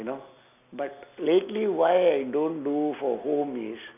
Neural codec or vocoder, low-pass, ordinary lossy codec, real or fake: none; 3.6 kHz; none; real